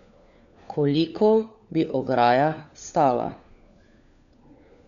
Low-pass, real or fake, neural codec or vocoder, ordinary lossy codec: 7.2 kHz; fake; codec, 16 kHz, 4 kbps, FunCodec, trained on LibriTTS, 50 frames a second; none